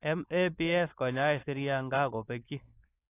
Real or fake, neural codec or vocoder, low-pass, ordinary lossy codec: fake; codec, 16 kHz, 4.8 kbps, FACodec; 3.6 kHz; AAC, 24 kbps